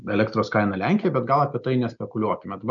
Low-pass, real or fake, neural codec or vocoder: 7.2 kHz; real; none